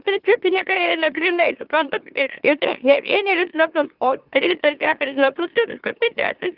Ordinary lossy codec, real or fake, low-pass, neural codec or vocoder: Opus, 24 kbps; fake; 5.4 kHz; autoencoder, 44.1 kHz, a latent of 192 numbers a frame, MeloTTS